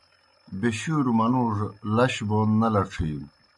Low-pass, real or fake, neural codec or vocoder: 10.8 kHz; real; none